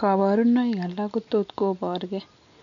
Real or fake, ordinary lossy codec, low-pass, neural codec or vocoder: real; none; 7.2 kHz; none